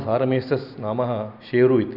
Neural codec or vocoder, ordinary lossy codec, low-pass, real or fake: none; none; 5.4 kHz; real